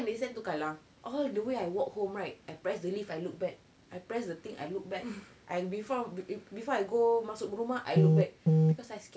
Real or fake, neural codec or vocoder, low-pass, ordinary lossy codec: real; none; none; none